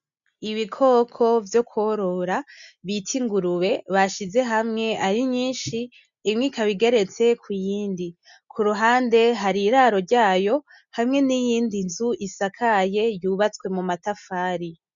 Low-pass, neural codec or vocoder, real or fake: 7.2 kHz; none; real